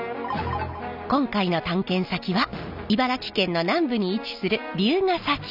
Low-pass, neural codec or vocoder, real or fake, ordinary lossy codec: 5.4 kHz; none; real; none